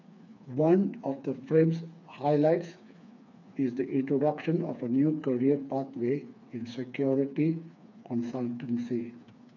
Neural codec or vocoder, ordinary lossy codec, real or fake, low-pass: codec, 16 kHz, 4 kbps, FreqCodec, smaller model; none; fake; 7.2 kHz